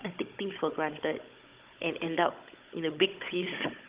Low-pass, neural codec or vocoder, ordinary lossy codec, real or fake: 3.6 kHz; codec, 16 kHz, 16 kbps, FunCodec, trained on Chinese and English, 50 frames a second; Opus, 24 kbps; fake